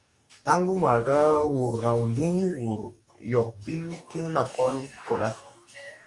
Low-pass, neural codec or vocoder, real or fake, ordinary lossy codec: 10.8 kHz; codec, 44.1 kHz, 2.6 kbps, DAC; fake; Opus, 64 kbps